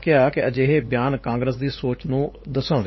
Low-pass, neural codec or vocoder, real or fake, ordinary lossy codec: 7.2 kHz; codec, 16 kHz, 8 kbps, FunCodec, trained on LibriTTS, 25 frames a second; fake; MP3, 24 kbps